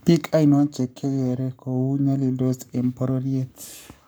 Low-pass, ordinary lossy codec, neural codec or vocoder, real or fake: none; none; codec, 44.1 kHz, 7.8 kbps, Pupu-Codec; fake